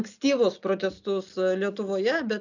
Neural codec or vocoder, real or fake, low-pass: none; real; 7.2 kHz